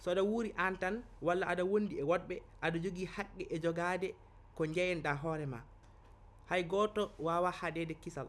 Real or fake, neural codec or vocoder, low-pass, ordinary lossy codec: real; none; none; none